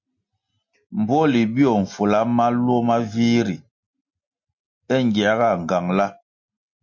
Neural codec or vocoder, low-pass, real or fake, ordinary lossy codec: none; 7.2 kHz; real; MP3, 48 kbps